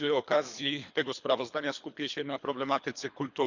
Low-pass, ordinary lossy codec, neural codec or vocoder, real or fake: 7.2 kHz; none; codec, 24 kHz, 3 kbps, HILCodec; fake